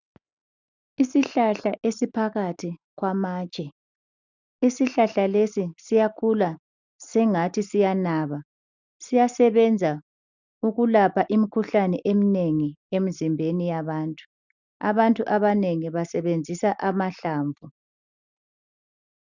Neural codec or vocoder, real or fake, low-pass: none; real; 7.2 kHz